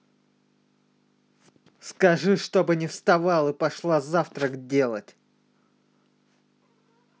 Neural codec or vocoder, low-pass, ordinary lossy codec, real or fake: none; none; none; real